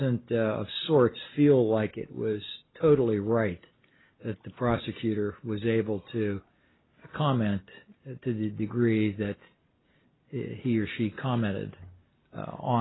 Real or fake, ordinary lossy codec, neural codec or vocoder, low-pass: real; AAC, 16 kbps; none; 7.2 kHz